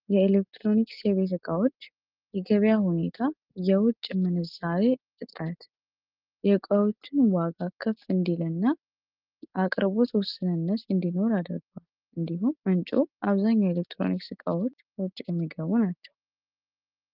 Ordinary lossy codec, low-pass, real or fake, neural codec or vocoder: Opus, 32 kbps; 5.4 kHz; real; none